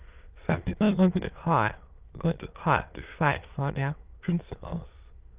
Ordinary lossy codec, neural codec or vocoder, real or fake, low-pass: Opus, 32 kbps; autoencoder, 22.05 kHz, a latent of 192 numbers a frame, VITS, trained on many speakers; fake; 3.6 kHz